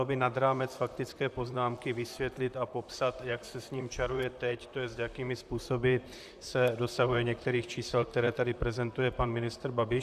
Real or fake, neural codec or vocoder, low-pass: fake; vocoder, 44.1 kHz, 128 mel bands, Pupu-Vocoder; 14.4 kHz